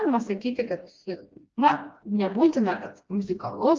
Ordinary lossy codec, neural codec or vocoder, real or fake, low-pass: Opus, 24 kbps; codec, 16 kHz, 1 kbps, FreqCodec, smaller model; fake; 7.2 kHz